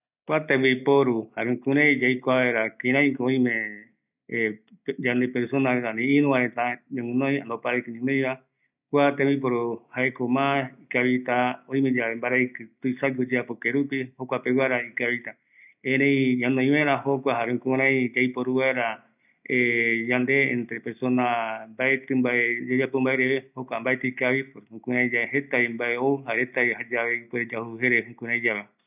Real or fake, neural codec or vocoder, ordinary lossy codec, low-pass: real; none; none; 3.6 kHz